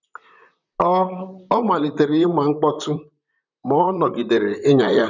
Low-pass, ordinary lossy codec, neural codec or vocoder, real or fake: 7.2 kHz; none; vocoder, 44.1 kHz, 128 mel bands, Pupu-Vocoder; fake